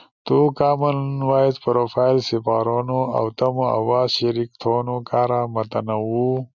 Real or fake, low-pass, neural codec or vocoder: real; 7.2 kHz; none